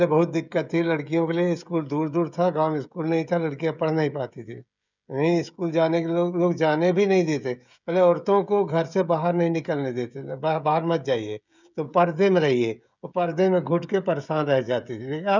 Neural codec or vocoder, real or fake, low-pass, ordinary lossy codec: codec, 16 kHz, 16 kbps, FreqCodec, smaller model; fake; 7.2 kHz; none